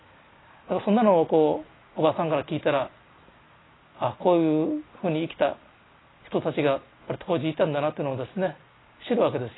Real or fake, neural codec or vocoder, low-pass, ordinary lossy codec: real; none; 7.2 kHz; AAC, 16 kbps